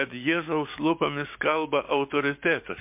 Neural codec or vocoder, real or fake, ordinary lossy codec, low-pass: codec, 16 kHz, 6 kbps, DAC; fake; MP3, 32 kbps; 3.6 kHz